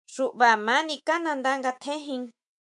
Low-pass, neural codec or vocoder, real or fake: 10.8 kHz; autoencoder, 48 kHz, 128 numbers a frame, DAC-VAE, trained on Japanese speech; fake